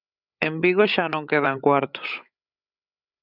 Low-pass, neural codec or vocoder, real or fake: 5.4 kHz; codec, 16 kHz, 8 kbps, FreqCodec, larger model; fake